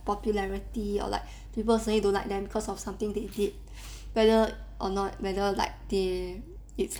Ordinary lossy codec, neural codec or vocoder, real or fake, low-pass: none; none; real; none